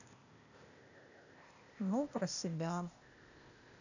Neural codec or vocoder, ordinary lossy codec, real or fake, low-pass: codec, 16 kHz, 0.8 kbps, ZipCodec; none; fake; 7.2 kHz